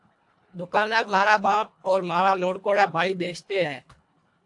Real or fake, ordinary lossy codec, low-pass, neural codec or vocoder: fake; AAC, 64 kbps; 10.8 kHz; codec, 24 kHz, 1.5 kbps, HILCodec